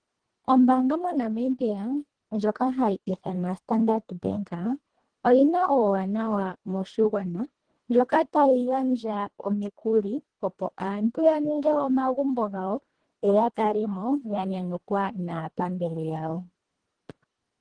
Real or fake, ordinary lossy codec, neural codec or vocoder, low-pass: fake; Opus, 16 kbps; codec, 24 kHz, 1.5 kbps, HILCodec; 9.9 kHz